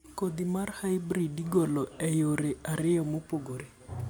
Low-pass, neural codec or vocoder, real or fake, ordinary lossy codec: none; none; real; none